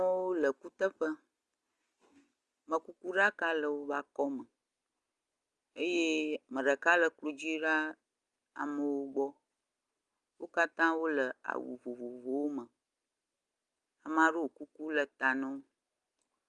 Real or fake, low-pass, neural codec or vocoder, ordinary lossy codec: real; 10.8 kHz; none; Opus, 32 kbps